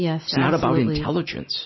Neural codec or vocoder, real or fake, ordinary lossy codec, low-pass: none; real; MP3, 24 kbps; 7.2 kHz